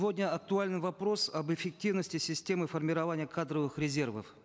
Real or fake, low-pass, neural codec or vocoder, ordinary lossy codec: real; none; none; none